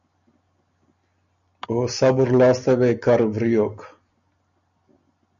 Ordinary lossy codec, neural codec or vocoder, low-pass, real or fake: MP3, 96 kbps; none; 7.2 kHz; real